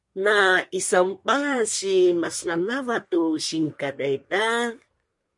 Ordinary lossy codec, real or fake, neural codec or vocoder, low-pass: MP3, 48 kbps; fake; codec, 24 kHz, 1 kbps, SNAC; 10.8 kHz